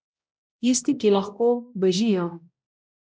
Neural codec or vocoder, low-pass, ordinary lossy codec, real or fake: codec, 16 kHz, 0.5 kbps, X-Codec, HuBERT features, trained on balanced general audio; none; none; fake